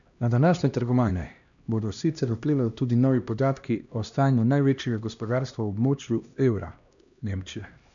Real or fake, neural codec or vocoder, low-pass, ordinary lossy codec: fake; codec, 16 kHz, 1 kbps, X-Codec, HuBERT features, trained on LibriSpeech; 7.2 kHz; none